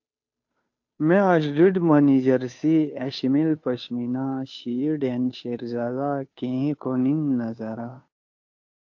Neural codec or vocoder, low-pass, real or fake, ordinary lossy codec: codec, 16 kHz, 2 kbps, FunCodec, trained on Chinese and English, 25 frames a second; 7.2 kHz; fake; AAC, 48 kbps